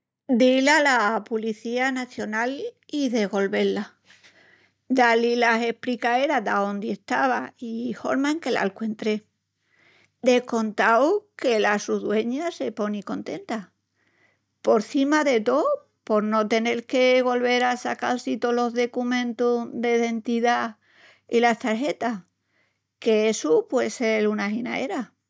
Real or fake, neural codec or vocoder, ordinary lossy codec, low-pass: real; none; none; none